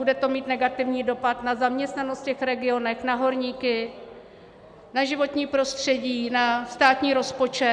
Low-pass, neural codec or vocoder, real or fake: 9.9 kHz; none; real